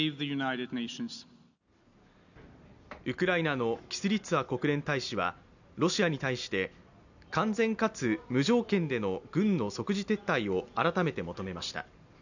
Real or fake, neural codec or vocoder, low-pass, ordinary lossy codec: real; none; 7.2 kHz; none